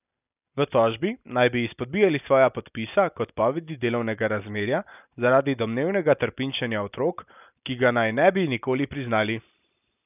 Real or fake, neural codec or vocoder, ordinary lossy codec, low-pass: real; none; none; 3.6 kHz